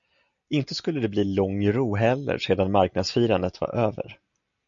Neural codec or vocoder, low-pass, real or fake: none; 7.2 kHz; real